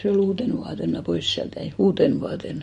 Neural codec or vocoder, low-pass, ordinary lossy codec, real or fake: none; 10.8 kHz; MP3, 48 kbps; real